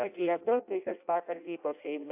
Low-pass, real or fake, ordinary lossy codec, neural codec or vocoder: 3.6 kHz; fake; AAC, 32 kbps; codec, 16 kHz in and 24 kHz out, 0.6 kbps, FireRedTTS-2 codec